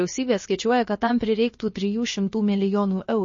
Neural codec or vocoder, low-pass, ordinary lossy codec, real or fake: codec, 16 kHz, about 1 kbps, DyCAST, with the encoder's durations; 7.2 kHz; MP3, 32 kbps; fake